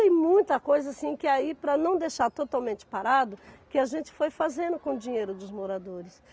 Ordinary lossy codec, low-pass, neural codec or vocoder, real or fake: none; none; none; real